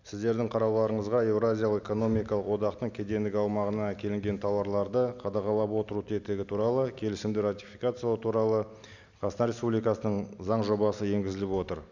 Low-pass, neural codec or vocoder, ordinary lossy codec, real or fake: 7.2 kHz; none; none; real